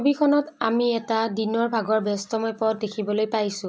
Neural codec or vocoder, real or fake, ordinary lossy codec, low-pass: none; real; none; none